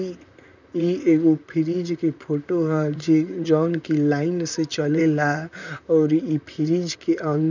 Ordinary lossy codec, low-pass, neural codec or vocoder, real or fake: none; 7.2 kHz; vocoder, 44.1 kHz, 128 mel bands, Pupu-Vocoder; fake